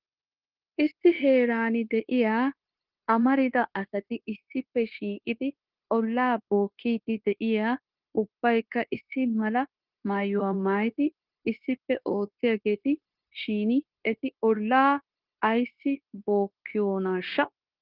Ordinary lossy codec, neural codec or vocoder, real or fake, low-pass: Opus, 32 kbps; codec, 16 kHz, 0.9 kbps, LongCat-Audio-Codec; fake; 5.4 kHz